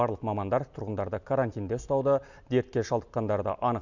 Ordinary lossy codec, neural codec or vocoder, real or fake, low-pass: none; none; real; 7.2 kHz